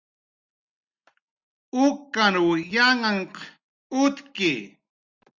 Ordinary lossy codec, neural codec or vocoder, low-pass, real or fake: Opus, 64 kbps; none; 7.2 kHz; real